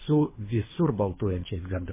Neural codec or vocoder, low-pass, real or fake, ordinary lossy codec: codec, 24 kHz, 3 kbps, HILCodec; 3.6 kHz; fake; MP3, 16 kbps